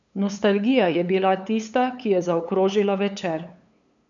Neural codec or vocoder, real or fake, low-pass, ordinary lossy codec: codec, 16 kHz, 8 kbps, FunCodec, trained on LibriTTS, 25 frames a second; fake; 7.2 kHz; none